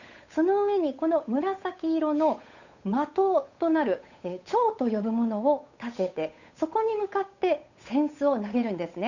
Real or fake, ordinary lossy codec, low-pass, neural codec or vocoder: fake; none; 7.2 kHz; codec, 16 kHz, 8 kbps, FunCodec, trained on Chinese and English, 25 frames a second